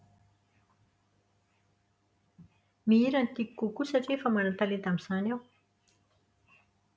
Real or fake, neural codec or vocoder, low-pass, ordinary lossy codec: real; none; none; none